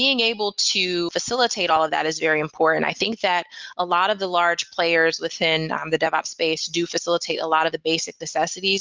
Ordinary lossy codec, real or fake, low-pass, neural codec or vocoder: Opus, 32 kbps; real; 7.2 kHz; none